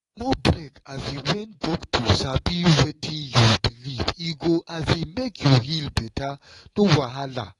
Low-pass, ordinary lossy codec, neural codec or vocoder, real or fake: 10.8 kHz; AAC, 32 kbps; codec, 24 kHz, 3.1 kbps, DualCodec; fake